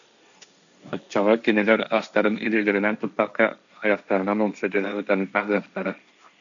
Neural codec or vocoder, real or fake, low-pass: codec, 16 kHz, 1.1 kbps, Voila-Tokenizer; fake; 7.2 kHz